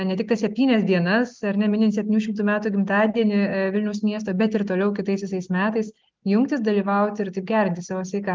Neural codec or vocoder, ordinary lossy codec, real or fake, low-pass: none; Opus, 32 kbps; real; 7.2 kHz